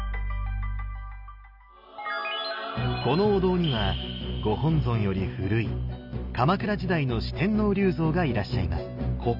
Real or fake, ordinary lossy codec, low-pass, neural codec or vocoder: real; none; 5.4 kHz; none